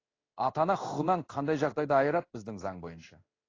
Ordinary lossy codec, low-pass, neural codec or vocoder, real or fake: AAC, 32 kbps; 7.2 kHz; codec, 16 kHz in and 24 kHz out, 1 kbps, XY-Tokenizer; fake